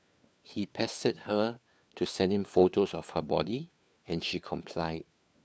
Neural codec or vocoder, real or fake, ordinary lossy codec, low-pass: codec, 16 kHz, 4 kbps, FunCodec, trained on LibriTTS, 50 frames a second; fake; none; none